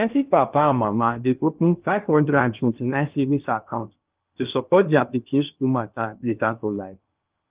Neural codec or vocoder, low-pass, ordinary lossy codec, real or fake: codec, 16 kHz in and 24 kHz out, 0.6 kbps, FocalCodec, streaming, 4096 codes; 3.6 kHz; Opus, 24 kbps; fake